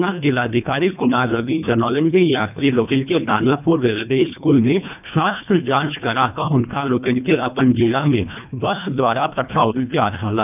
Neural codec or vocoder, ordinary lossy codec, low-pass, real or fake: codec, 24 kHz, 1.5 kbps, HILCodec; none; 3.6 kHz; fake